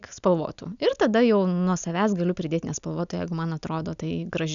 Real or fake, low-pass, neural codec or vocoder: real; 7.2 kHz; none